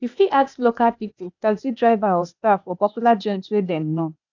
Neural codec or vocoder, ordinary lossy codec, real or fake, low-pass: codec, 16 kHz, 0.8 kbps, ZipCodec; none; fake; 7.2 kHz